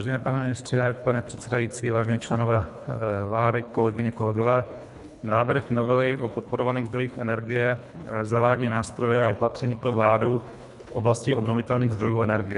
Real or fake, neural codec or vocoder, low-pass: fake; codec, 24 kHz, 1.5 kbps, HILCodec; 10.8 kHz